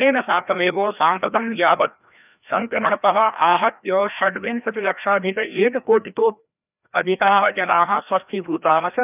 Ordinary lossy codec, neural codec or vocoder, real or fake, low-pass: none; codec, 16 kHz, 1 kbps, FreqCodec, larger model; fake; 3.6 kHz